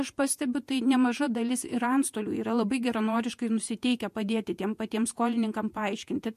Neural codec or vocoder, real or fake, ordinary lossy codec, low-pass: vocoder, 48 kHz, 128 mel bands, Vocos; fake; MP3, 64 kbps; 14.4 kHz